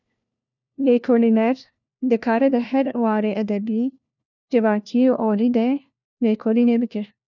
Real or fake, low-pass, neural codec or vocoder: fake; 7.2 kHz; codec, 16 kHz, 1 kbps, FunCodec, trained on LibriTTS, 50 frames a second